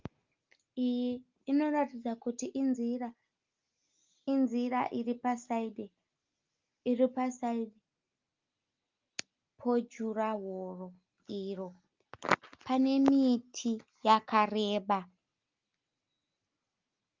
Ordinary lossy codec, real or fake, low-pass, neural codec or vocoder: Opus, 24 kbps; real; 7.2 kHz; none